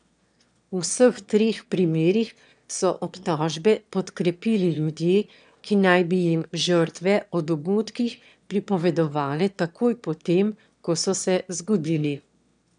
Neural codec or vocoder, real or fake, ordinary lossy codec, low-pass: autoencoder, 22.05 kHz, a latent of 192 numbers a frame, VITS, trained on one speaker; fake; none; 9.9 kHz